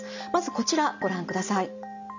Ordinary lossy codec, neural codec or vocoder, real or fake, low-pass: none; none; real; 7.2 kHz